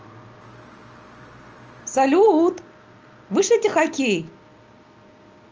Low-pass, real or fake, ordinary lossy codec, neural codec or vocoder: 7.2 kHz; real; Opus, 24 kbps; none